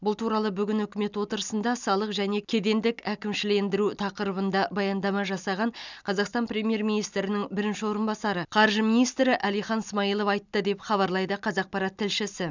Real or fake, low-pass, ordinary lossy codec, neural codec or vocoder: real; 7.2 kHz; none; none